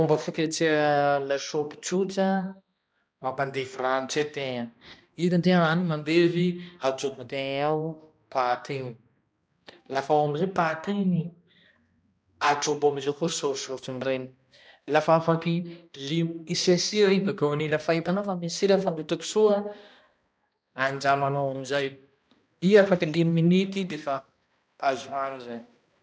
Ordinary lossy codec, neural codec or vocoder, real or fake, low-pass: none; codec, 16 kHz, 1 kbps, X-Codec, HuBERT features, trained on balanced general audio; fake; none